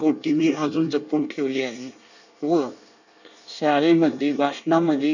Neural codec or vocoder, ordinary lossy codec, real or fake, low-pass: codec, 24 kHz, 1 kbps, SNAC; none; fake; 7.2 kHz